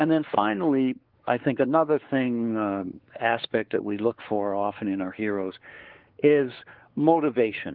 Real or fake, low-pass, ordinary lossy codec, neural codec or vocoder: fake; 5.4 kHz; Opus, 16 kbps; codec, 16 kHz, 4 kbps, X-Codec, WavLM features, trained on Multilingual LibriSpeech